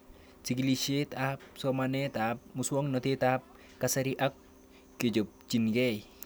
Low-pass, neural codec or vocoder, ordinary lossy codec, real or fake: none; none; none; real